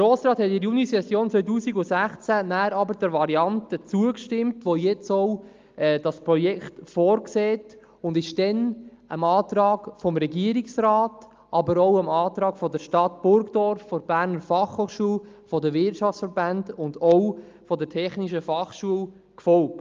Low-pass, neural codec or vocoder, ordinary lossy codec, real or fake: 7.2 kHz; none; Opus, 32 kbps; real